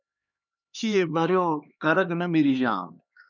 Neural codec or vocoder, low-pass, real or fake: codec, 16 kHz, 2 kbps, X-Codec, HuBERT features, trained on LibriSpeech; 7.2 kHz; fake